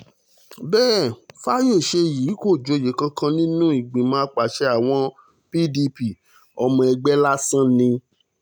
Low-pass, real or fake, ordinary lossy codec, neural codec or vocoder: none; real; none; none